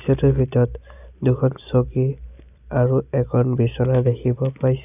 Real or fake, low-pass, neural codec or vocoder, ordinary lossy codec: real; 3.6 kHz; none; none